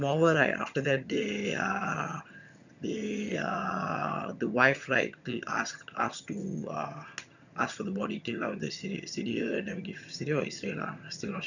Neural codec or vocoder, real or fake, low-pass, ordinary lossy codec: vocoder, 22.05 kHz, 80 mel bands, HiFi-GAN; fake; 7.2 kHz; none